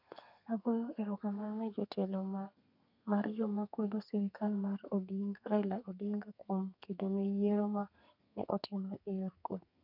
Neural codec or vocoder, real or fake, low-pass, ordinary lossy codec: codec, 32 kHz, 1.9 kbps, SNAC; fake; 5.4 kHz; none